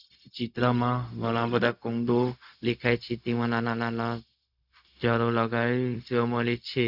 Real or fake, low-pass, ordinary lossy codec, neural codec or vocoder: fake; 5.4 kHz; none; codec, 16 kHz, 0.4 kbps, LongCat-Audio-Codec